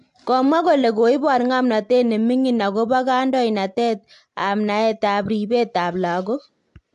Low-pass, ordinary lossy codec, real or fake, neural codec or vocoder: 10.8 kHz; AAC, 64 kbps; real; none